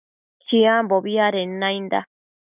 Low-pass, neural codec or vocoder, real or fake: 3.6 kHz; none; real